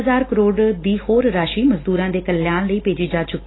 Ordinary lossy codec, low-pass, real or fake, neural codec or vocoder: AAC, 16 kbps; 7.2 kHz; real; none